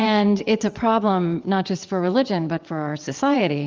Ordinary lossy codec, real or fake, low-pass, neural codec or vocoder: Opus, 32 kbps; fake; 7.2 kHz; vocoder, 22.05 kHz, 80 mel bands, WaveNeXt